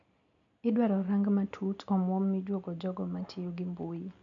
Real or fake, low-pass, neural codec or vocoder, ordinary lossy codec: real; 7.2 kHz; none; none